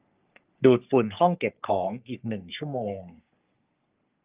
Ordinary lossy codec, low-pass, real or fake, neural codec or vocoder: Opus, 32 kbps; 3.6 kHz; fake; codec, 44.1 kHz, 3.4 kbps, Pupu-Codec